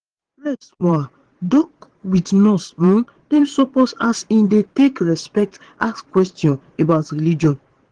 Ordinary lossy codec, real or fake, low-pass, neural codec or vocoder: Opus, 16 kbps; fake; 9.9 kHz; vocoder, 22.05 kHz, 80 mel bands, Vocos